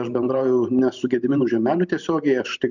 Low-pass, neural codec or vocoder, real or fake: 7.2 kHz; vocoder, 44.1 kHz, 128 mel bands every 512 samples, BigVGAN v2; fake